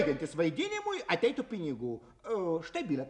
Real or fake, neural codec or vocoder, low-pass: real; none; 10.8 kHz